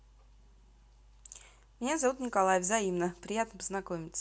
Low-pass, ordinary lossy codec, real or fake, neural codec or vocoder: none; none; real; none